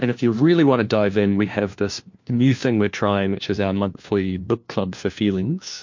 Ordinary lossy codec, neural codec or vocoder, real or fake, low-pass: MP3, 48 kbps; codec, 16 kHz, 1 kbps, FunCodec, trained on LibriTTS, 50 frames a second; fake; 7.2 kHz